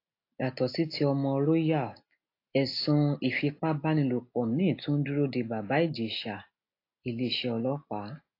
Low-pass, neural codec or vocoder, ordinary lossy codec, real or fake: 5.4 kHz; none; AAC, 32 kbps; real